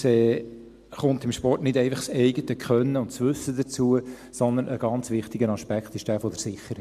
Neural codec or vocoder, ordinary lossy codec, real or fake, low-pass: none; MP3, 96 kbps; real; 14.4 kHz